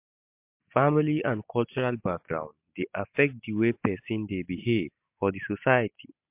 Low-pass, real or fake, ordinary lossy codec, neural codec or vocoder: 3.6 kHz; real; MP3, 32 kbps; none